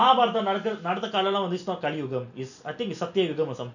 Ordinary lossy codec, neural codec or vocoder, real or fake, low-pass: none; none; real; 7.2 kHz